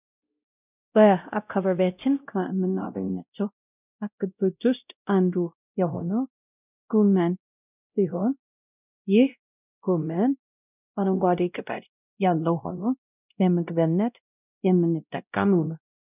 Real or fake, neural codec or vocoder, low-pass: fake; codec, 16 kHz, 0.5 kbps, X-Codec, WavLM features, trained on Multilingual LibriSpeech; 3.6 kHz